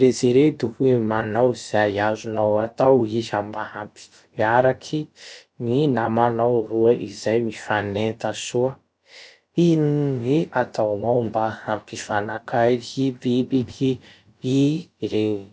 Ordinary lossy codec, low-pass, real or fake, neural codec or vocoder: none; none; fake; codec, 16 kHz, about 1 kbps, DyCAST, with the encoder's durations